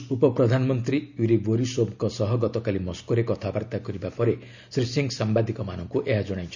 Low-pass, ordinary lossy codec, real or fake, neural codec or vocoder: 7.2 kHz; none; real; none